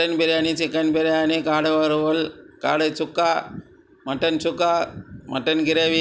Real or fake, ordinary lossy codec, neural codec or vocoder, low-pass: real; none; none; none